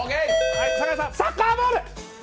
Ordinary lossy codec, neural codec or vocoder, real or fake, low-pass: none; none; real; none